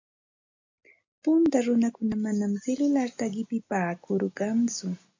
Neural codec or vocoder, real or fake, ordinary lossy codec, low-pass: none; real; AAC, 48 kbps; 7.2 kHz